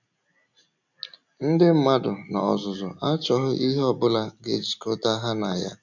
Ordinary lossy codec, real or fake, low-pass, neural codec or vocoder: none; real; 7.2 kHz; none